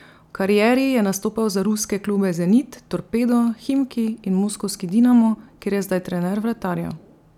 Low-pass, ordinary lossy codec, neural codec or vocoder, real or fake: 19.8 kHz; none; none; real